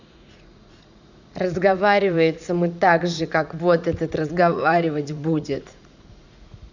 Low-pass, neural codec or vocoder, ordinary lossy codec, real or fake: 7.2 kHz; vocoder, 44.1 kHz, 80 mel bands, Vocos; none; fake